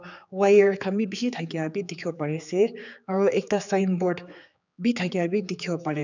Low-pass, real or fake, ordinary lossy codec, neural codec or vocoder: 7.2 kHz; fake; none; codec, 16 kHz, 4 kbps, X-Codec, HuBERT features, trained on general audio